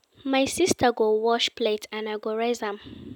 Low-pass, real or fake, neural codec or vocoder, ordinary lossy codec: 19.8 kHz; real; none; none